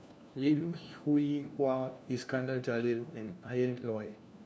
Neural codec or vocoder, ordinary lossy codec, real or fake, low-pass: codec, 16 kHz, 1 kbps, FunCodec, trained on LibriTTS, 50 frames a second; none; fake; none